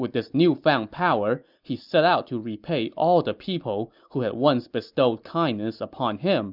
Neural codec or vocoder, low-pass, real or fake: none; 5.4 kHz; real